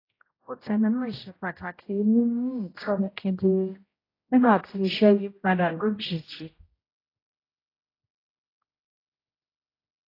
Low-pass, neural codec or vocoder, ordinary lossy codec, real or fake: 5.4 kHz; codec, 16 kHz, 0.5 kbps, X-Codec, HuBERT features, trained on general audio; AAC, 24 kbps; fake